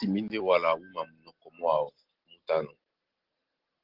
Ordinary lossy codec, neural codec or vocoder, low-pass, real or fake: Opus, 16 kbps; none; 5.4 kHz; real